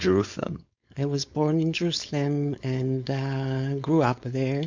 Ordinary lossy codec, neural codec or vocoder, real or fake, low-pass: MP3, 64 kbps; codec, 16 kHz, 4.8 kbps, FACodec; fake; 7.2 kHz